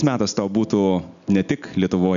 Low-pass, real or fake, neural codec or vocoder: 7.2 kHz; real; none